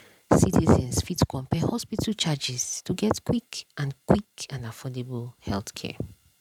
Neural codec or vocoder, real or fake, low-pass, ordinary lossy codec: vocoder, 44.1 kHz, 128 mel bands every 512 samples, BigVGAN v2; fake; 19.8 kHz; none